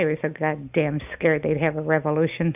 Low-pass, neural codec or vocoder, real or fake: 3.6 kHz; none; real